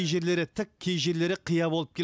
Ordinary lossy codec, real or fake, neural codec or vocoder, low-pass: none; real; none; none